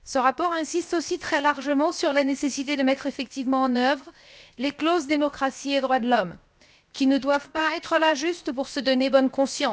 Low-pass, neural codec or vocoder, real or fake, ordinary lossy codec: none; codec, 16 kHz, 0.7 kbps, FocalCodec; fake; none